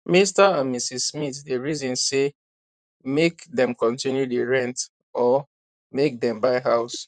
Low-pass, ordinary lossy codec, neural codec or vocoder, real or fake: 9.9 kHz; none; vocoder, 44.1 kHz, 128 mel bands, Pupu-Vocoder; fake